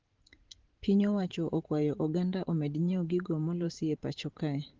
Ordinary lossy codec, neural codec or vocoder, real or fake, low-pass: Opus, 16 kbps; vocoder, 44.1 kHz, 128 mel bands every 512 samples, BigVGAN v2; fake; 7.2 kHz